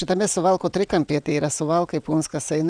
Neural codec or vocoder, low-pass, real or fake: vocoder, 22.05 kHz, 80 mel bands, WaveNeXt; 9.9 kHz; fake